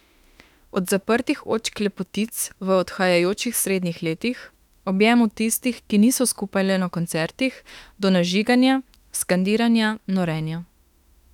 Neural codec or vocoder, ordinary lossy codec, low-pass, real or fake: autoencoder, 48 kHz, 32 numbers a frame, DAC-VAE, trained on Japanese speech; none; 19.8 kHz; fake